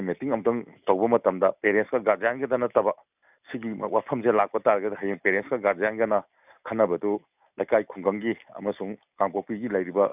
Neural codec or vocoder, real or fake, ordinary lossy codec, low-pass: none; real; none; 3.6 kHz